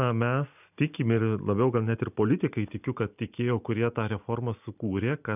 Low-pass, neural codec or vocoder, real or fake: 3.6 kHz; none; real